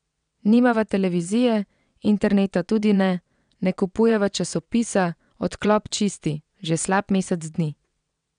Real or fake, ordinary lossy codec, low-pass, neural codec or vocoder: fake; none; 9.9 kHz; vocoder, 22.05 kHz, 80 mel bands, WaveNeXt